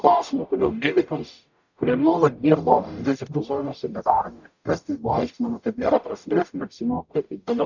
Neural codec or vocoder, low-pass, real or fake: codec, 44.1 kHz, 0.9 kbps, DAC; 7.2 kHz; fake